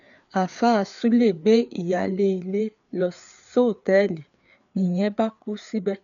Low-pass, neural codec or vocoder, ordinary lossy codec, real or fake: 7.2 kHz; codec, 16 kHz, 4 kbps, FreqCodec, larger model; none; fake